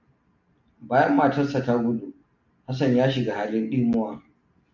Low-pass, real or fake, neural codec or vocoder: 7.2 kHz; real; none